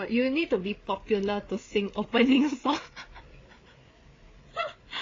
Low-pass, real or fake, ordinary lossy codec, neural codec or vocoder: 7.2 kHz; fake; AAC, 32 kbps; codec, 16 kHz, 8 kbps, FreqCodec, larger model